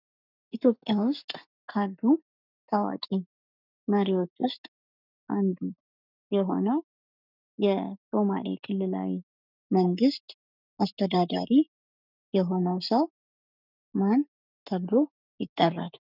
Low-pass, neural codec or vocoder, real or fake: 5.4 kHz; codec, 44.1 kHz, 7.8 kbps, Pupu-Codec; fake